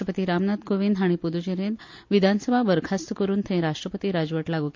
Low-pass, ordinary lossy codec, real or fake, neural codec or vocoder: 7.2 kHz; MP3, 48 kbps; real; none